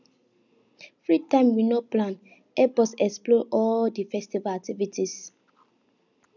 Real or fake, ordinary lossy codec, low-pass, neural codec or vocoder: real; none; 7.2 kHz; none